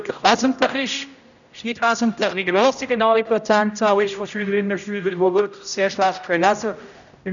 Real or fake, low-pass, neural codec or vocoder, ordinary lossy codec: fake; 7.2 kHz; codec, 16 kHz, 0.5 kbps, X-Codec, HuBERT features, trained on general audio; none